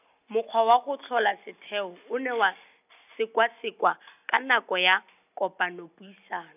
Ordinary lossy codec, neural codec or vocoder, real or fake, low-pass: none; none; real; 3.6 kHz